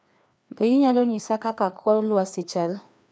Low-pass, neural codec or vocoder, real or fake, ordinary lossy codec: none; codec, 16 kHz, 2 kbps, FreqCodec, larger model; fake; none